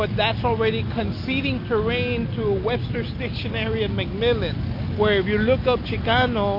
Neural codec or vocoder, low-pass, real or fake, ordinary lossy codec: none; 5.4 kHz; real; MP3, 32 kbps